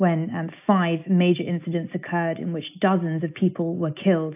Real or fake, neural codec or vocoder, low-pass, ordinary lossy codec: real; none; 3.6 kHz; AAC, 32 kbps